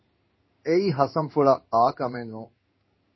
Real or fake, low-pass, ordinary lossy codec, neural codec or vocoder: fake; 7.2 kHz; MP3, 24 kbps; codec, 16 kHz in and 24 kHz out, 1 kbps, XY-Tokenizer